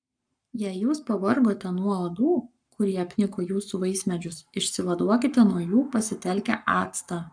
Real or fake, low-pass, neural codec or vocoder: fake; 9.9 kHz; codec, 44.1 kHz, 7.8 kbps, Pupu-Codec